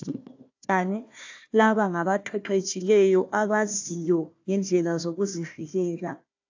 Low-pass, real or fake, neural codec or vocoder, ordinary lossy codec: 7.2 kHz; fake; codec, 16 kHz, 1 kbps, FunCodec, trained on Chinese and English, 50 frames a second; AAC, 48 kbps